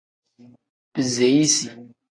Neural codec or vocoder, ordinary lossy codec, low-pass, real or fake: none; AAC, 32 kbps; 9.9 kHz; real